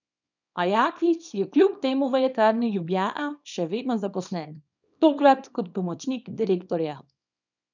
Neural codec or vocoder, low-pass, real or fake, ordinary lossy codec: codec, 24 kHz, 0.9 kbps, WavTokenizer, small release; 7.2 kHz; fake; none